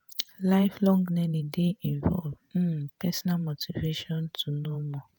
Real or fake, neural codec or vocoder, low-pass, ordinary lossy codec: fake; vocoder, 48 kHz, 128 mel bands, Vocos; none; none